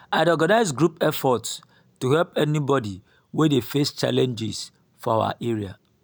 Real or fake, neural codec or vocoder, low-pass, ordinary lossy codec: real; none; none; none